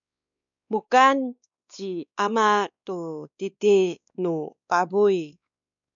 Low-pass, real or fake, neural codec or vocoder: 7.2 kHz; fake; codec, 16 kHz, 2 kbps, X-Codec, WavLM features, trained on Multilingual LibriSpeech